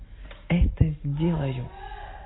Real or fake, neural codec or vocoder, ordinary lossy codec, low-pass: real; none; AAC, 16 kbps; 7.2 kHz